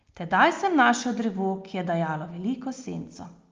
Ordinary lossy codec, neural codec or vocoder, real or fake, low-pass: Opus, 32 kbps; none; real; 7.2 kHz